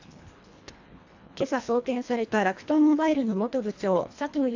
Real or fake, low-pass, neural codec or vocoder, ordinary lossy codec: fake; 7.2 kHz; codec, 24 kHz, 1.5 kbps, HILCodec; AAC, 48 kbps